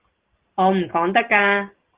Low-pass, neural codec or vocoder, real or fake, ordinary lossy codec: 3.6 kHz; none; real; Opus, 16 kbps